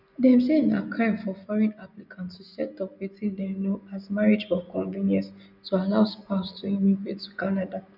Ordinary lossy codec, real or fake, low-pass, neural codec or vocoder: none; real; 5.4 kHz; none